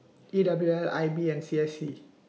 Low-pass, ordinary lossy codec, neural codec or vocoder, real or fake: none; none; none; real